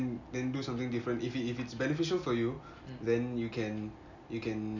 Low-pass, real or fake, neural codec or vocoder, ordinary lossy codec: 7.2 kHz; real; none; none